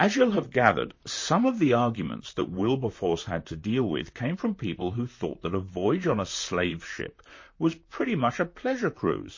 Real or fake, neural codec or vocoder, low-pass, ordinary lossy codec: fake; vocoder, 44.1 kHz, 128 mel bands, Pupu-Vocoder; 7.2 kHz; MP3, 32 kbps